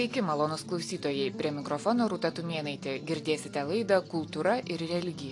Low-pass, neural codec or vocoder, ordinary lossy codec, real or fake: 10.8 kHz; none; AAC, 48 kbps; real